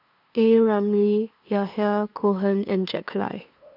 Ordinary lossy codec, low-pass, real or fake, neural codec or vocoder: none; 5.4 kHz; fake; codec, 16 kHz, 2 kbps, FunCodec, trained on LibriTTS, 25 frames a second